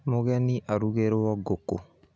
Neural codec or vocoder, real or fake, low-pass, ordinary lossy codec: none; real; none; none